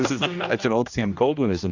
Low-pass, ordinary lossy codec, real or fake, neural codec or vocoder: 7.2 kHz; Opus, 64 kbps; fake; codec, 16 kHz, 1 kbps, X-Codec, HuBERT features, trained on general audio